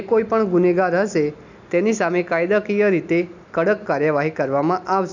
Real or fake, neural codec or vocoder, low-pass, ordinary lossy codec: real; none; 7.2 kHz; none